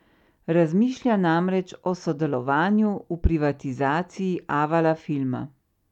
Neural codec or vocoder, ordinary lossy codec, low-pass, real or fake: none; none; 19.8 kHz; real